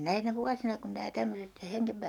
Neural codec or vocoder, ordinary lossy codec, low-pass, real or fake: codec, 44.1 kHz, 7.8 kbps, DAC; none; 19.8 kHz; fake